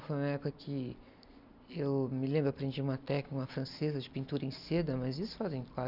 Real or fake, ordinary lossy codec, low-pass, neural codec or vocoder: real; none; 5.4 kHz; none